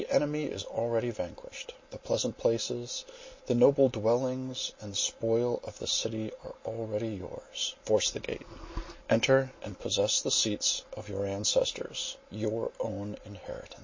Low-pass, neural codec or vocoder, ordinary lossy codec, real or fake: 7.2 kHz; none; MP3, 32 kbps; real